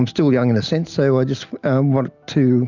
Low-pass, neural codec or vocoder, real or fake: 7.2 kHz; none; real